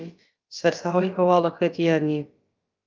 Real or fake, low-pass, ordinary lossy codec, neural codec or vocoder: fake; 7.2 kHz; Opus, 24 kbps; codec, 16 kHz, about 1 kbps, DyCAST, with the encoder's durations